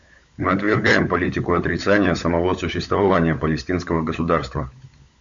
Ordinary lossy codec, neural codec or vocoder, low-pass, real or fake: MP3, 64 kbps; codec, 16 kHz, 16 kbps, FunCodec, trained on LibriTTS, 50 frames a second; 7.2 kHz; fake